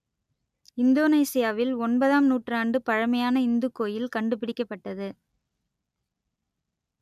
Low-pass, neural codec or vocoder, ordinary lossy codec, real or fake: 14.4 kHz; none; none; real